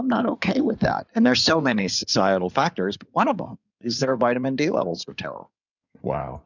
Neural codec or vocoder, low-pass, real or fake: codec, 16 kHz in and 24 kHz out, 2.2 kbps, FireRedTTS-2 codec; 7.2 kHz; fake